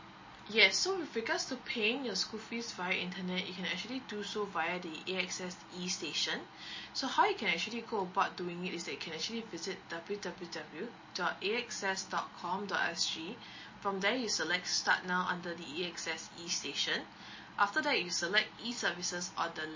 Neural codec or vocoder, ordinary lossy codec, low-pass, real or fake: none; MP3, 32 kbps; 7.2 kHz; real